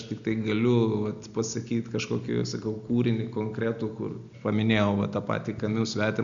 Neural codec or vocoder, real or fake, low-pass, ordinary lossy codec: none; real; 7.2 kHz; MP3, 64 kbps